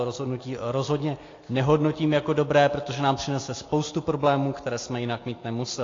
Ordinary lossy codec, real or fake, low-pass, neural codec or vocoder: AAC, 32 kbps; real; 7.2 kHz; none